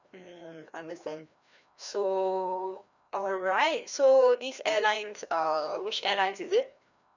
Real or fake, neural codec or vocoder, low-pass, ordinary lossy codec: fake; codec, 16 kHz, 1 kbps, FreqCodec, larger model; 7.2 kHz; none